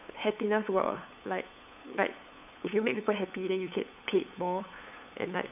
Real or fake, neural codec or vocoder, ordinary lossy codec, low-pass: fake; codec, 16 kHz, 8 kbps, FunCodec, trained on LibriTTS, 25 frames a second; none; 3.6 kHz